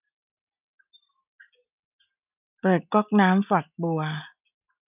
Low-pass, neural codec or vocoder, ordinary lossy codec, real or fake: 3.6 kHz; none; none; real